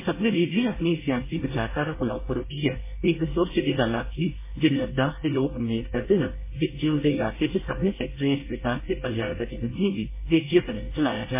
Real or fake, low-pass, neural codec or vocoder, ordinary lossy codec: fake; 3.6 kHz; codec, 24 kHz, 1 kbps, SNAC; MP3, 16 kbps